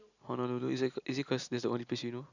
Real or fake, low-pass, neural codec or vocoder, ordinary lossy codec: real; 7.2 kHz; none; none